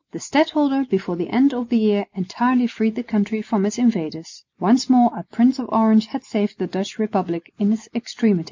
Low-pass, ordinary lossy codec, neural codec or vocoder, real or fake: 7.2 kHz; MP3, 48 kbps; none; real